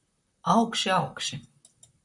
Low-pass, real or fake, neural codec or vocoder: 10.8 kHz; fake; vocoder, 44.1 kHz, 128 mel bands, Pupu-Vocoder